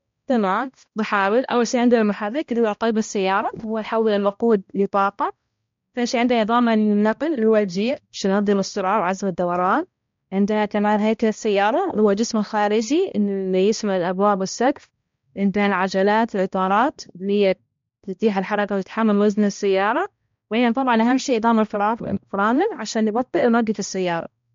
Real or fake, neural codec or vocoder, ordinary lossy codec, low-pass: fake; codec, 16 kHz, 1 kbps, X-Codec, HuBERT features, trained on balanced general audio; MP3, 48 kbps; 7.2 kHz